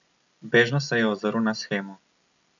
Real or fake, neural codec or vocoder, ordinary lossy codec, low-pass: real; none; none; 7.2 kHz